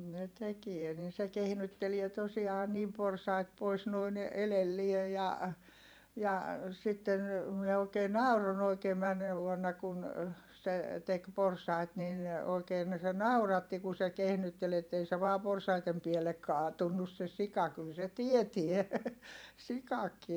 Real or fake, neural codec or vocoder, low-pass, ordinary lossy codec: fake; vocoder, 44.1 kHz, 128 mel bands every 512 samples, BigVGAN v2; none; none